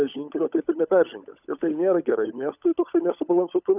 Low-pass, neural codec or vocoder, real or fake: 3.6 kHz; codec, 16 kHz, 16 kbps, FunCodec, trained on LibriTTS, 50 frames a second; fake